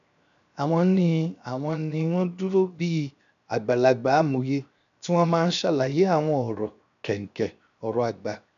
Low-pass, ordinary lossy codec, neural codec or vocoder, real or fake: 7.2 kHz; none; codec, 16 kHz, 0.7 kbps, FocalCodec; fake